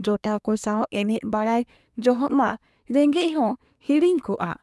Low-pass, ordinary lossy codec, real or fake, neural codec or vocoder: none; none; fake; codec, 24 kHz, 1 kbps, SNAC